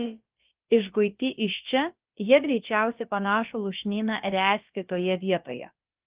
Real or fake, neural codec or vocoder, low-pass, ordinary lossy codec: fake; codec, 16 kHz, about 1 kbps, DyCAST, with the encoder's durations; 3.6 kHz; Opus, 32 kbps